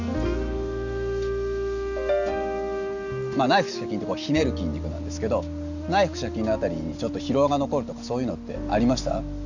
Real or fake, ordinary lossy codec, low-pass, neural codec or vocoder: real; none; 7.2 kHz; none